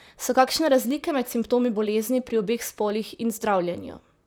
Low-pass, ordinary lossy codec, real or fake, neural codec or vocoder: none; none; fake; vocoder, 44.1 kHz, 128 mel bands, Pupu-Vocoder